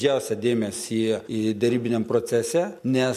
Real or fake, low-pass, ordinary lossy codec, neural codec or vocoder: real; 14.4 kHz; MP3, 64 kbps; none